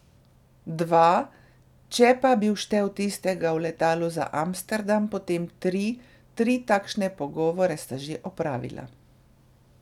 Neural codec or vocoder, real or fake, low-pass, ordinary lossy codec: none; real; 19.8 kHz; none